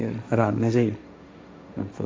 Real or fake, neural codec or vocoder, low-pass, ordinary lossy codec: fake; codec, 16 kHz, 1.1 kbps, Voila-Tokenizer; none; none